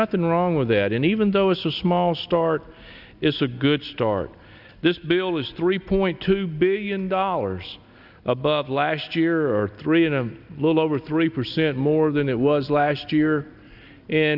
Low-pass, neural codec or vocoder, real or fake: 5.4 kHz; none; real